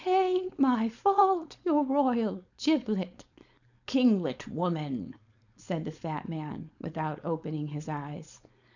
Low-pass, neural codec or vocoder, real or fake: 7.2 kHz; codec, 16 kHz, 4.8 kbps, FACodec; fake